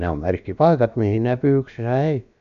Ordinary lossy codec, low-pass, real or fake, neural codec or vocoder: none; 7.2 kHz; fake; codec, 16 kHz, about 1 kbps, DyCAST, with the encoder's durations